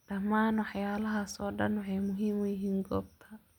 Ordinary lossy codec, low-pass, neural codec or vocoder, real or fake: none; 19.8 kHz; none; real